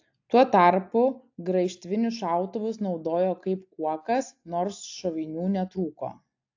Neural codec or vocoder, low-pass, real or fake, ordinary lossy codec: none; 7.2 kHz; real; AAC, 48 kbps